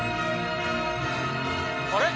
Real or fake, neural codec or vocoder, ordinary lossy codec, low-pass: real; none; none; none